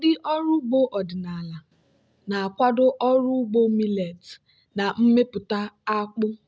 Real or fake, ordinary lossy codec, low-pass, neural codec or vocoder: real; none; none; none